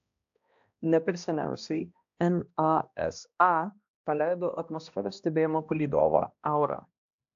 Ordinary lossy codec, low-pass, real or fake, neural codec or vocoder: AAC, 64 kbps; 7.2 kHz; fake; codec, 16 kHz, 1 kbps, X-Codec, HuBERT features, trained on balanced general audio